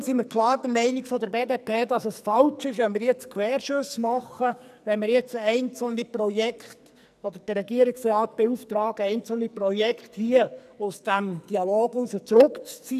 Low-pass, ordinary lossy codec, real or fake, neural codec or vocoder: 14.4 kHz; none; fake; codec, 44.1 kHz, 2.6 kbps, SNAC